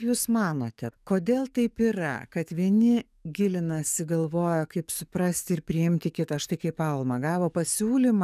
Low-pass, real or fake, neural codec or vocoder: 14.4 kHz; fake; codec, 44.1 kHz, 7.8 kbps, DAC